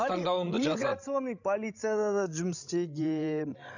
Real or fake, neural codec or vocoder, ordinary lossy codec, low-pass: fake; codec, 16 kHz, 16 kbps, FreqCodec, larger model; none; 7.2 kHz